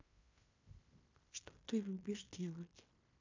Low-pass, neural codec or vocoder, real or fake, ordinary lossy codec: 7.2 kHz; codec, 16 kHz in and 24 kHz out, 0.9 kbps, LongCat-Audio-Codec, fine tuned four codebook decoder; fake; AAC, 48 kbps